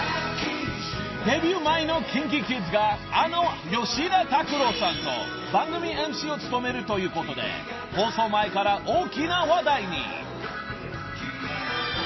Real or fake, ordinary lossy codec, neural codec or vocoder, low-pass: real; MP3, 24 kbps; none; 7.2 kHz